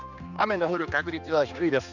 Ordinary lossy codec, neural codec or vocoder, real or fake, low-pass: none; codec, 16 kHz, 2 kbps, X-Codec, HuBERT features, trained on general audio; fake; 7.2 kHz